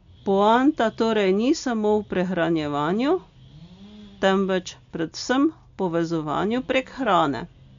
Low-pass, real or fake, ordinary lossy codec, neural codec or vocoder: 7.2 kHz; real; MP3, 64 kbps; none